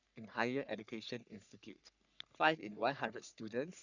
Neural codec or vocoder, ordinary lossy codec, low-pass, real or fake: codec, 44.1 kHz, 3.4 kbps, Pupu-Codec; none; 7.2 kHz; fake